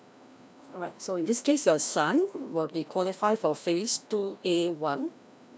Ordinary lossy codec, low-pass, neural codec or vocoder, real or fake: none; none; codec, 16 kHz, 1 kbps, FreqCodec, larger model; fake